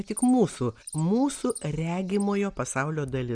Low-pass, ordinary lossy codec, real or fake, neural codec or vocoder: 9.9 kHz; Opus, 64 kbps; real; none